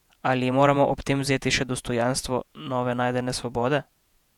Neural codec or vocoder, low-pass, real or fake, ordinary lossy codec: vocoder, 48 kHz, 128 mel bands, Vocos; 19.8 kHz; fake; none